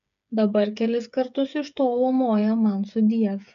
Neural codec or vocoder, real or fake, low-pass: codec, 16 kHz, 8 kbps, FreqCodec, smaller model; fake; 7.2 kHz